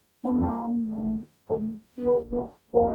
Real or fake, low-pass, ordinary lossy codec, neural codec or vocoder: fake; 19.8 kHz; none; codec, 44.1 kHz, 0.9 kbps, DAC